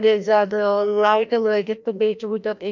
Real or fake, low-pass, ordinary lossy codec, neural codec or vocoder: fake; 7.2 kHz; none; codec, 16 kHz, 1 kbps, FreqCodec, larger model